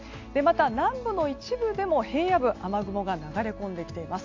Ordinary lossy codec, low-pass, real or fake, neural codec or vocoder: AAC, 48 kbps; 7.2 kHz; real; none